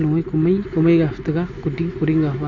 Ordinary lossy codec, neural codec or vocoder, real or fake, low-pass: AAC, 48 kbps; none; real; 7.2 kHz